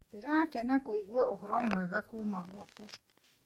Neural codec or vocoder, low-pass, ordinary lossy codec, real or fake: codec, 44.1 kHz, 2.6 kbps, DAC; 19.8 kHz; MP3, 64 kbps; fake